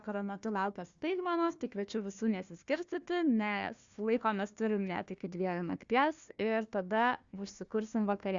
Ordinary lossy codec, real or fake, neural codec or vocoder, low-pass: Opus, 64 kbps; fake; codec, 16 kHz, 1 kbps, FunCodec, trained on Chinese and English, 50 frames a second; 7.2 kHz